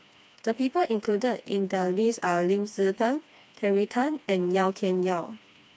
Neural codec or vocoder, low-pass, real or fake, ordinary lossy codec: codec, 16 kHz, 2 kbps, FreqCodec, smaller model; none; fake; none